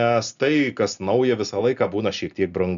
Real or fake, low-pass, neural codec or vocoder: real; 7.2 kHz; none